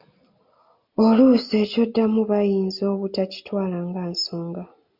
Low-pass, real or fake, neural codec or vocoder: 5.4 kHz; real; none